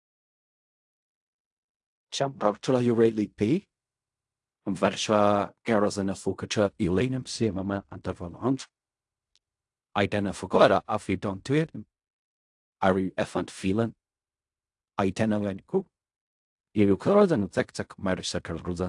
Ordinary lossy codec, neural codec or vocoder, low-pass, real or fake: AAC, 64 kbps; codec, 16 kHz in and 24 kHz out, 0.4 kbps, LongCat-Audio-Codec, fine tuned four codebook decoder; 10.8 kHz; fake